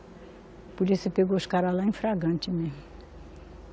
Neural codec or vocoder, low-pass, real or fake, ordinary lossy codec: none; none; real; none